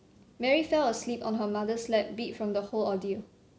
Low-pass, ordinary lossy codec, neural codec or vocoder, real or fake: none; none; none; real